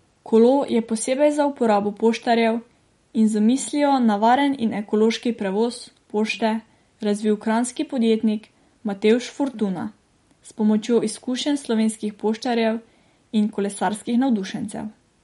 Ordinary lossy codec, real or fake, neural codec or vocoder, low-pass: MP3, 48 kbps; real; none; 19.8 kHz